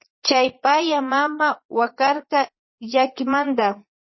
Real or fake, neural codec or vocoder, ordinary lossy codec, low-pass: fake; vocoder, 22.05 kHz, 80 mel bands, Vocos; MP3, 24 kbps; 7.2 kHz